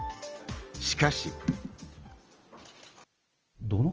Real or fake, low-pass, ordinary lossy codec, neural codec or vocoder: real; 7.2 kHz; Opus, 24 kbps; none